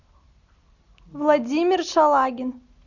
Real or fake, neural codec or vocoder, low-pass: real; none; 7.2 kHz